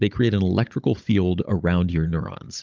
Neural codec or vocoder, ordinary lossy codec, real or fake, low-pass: codec, 16 kHz, 16 kbps, FunCodec, trained on Chinese and English, 50 frames a second; Opus, 24 kbps; fake; 7.2 kHz